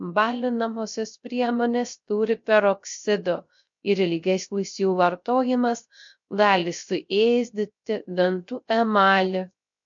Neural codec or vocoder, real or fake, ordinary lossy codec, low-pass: codec, 16 kHz, 0.3 kbps, FocalCodec; fake; MP3, 48 kbps; 7.2 kHz